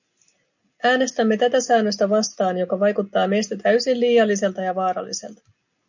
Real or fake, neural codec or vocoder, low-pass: real; none; 7.2 kHz